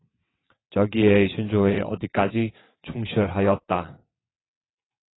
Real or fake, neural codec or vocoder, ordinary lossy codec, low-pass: fake; vocoder, 22.05 kHz, 80 mel bands, WaveNeXt; AAC, 16 kbps; 7.2 kHz